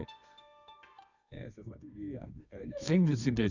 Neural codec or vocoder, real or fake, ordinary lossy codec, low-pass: codec, 24 kHz, 0.9 kbps, WavTokenizer, medium music audio release; fake; none; 7.2 kHz